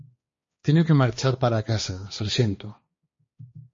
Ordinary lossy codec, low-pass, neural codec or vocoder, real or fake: MP3, 32 kbps; 7.2 kHz; codec, 16 kHz, 2 kbps, X-Codec, HuBERT features, trained on balanced general audio; fake